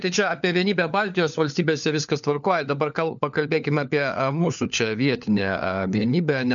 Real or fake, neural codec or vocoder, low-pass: fake; codec, 16 kHz, 4 kbps, FunCodec, trained on LibriTTS, 50 frames a second; 7.2 kHz